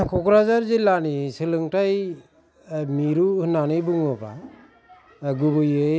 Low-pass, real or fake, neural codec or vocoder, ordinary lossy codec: none; real; none; none